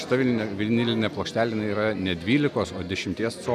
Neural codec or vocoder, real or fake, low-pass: none; real; 14.4 kHz